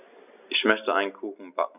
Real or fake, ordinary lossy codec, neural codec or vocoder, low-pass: real; none; none; 3.6 kHz